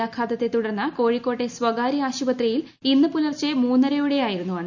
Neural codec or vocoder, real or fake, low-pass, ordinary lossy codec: none; real; 7.2 kHz; none